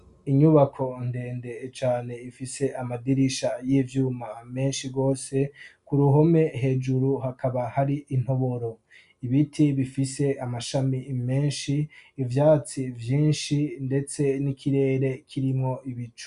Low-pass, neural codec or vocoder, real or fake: 10.8 kHz; none; real